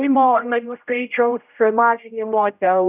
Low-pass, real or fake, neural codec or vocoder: 3.6 kHz; fake; codec, 16 kHz, 0.5 kbps, X-Codec, HuBERT features, trained on general audio